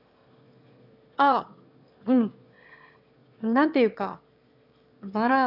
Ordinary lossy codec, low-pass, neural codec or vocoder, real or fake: none; 5.4 kHz; autoencoder, 22.05 kHz, a latent of 192 numbers a frame, VITS, trained on one speaker; fake